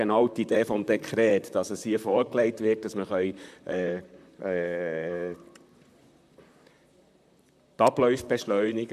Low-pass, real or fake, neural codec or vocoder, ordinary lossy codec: 14.4 kHz; fake; vocoder, 44.1 kHz, 128 mel bands, Pupu-Vocoder; MP3, 96 kbps